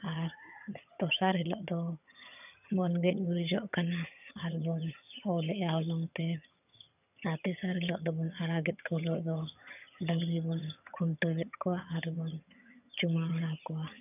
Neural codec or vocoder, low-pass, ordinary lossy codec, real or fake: vocoder, 22.05 kHz, 80 mel bands, HiFi-GAN; 3.6 kHz; none; fake